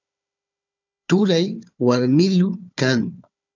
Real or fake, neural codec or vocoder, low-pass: fake; codec, 16 kHz, 4 kbps, FunCodec, trained on Chinese and English, 50 frames a second; 7.2 kHz